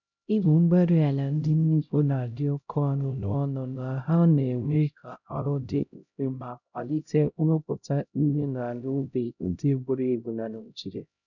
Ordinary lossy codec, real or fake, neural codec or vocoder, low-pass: none; fake; codec, 16 kHz, 0.5 kbps, X-Codec, HuBERT features, trained on LibriSpeech; 7.2 kHz